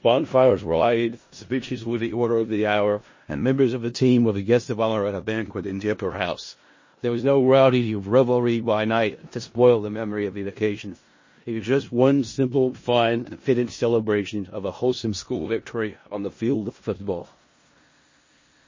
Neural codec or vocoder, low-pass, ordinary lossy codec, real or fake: codec, 16 kHz in and 24 kHz out, 0.4 kbps, LongCat-Audio-Codec, four codebook decoder; 7.2 kHz; MP3, 32 kbps; fake